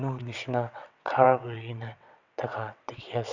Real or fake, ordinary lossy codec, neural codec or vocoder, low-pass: fake; none; vocoder, 22.05 kHz, 80 mel bands, WaveNeXt; 7.2 kHz